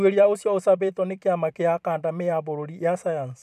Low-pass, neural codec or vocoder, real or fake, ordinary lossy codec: 14.4 kHz; vocoder, 44.1 kHz, 128 mel bands every 256 samples, BigVGAN v2; fake; none